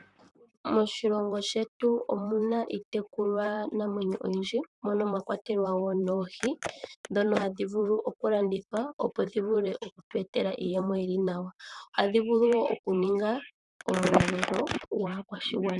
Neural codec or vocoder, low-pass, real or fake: vocoder, 44.1 kHz, 128 mel bands, Pupu-Vocoder; 10.8 kHz; fake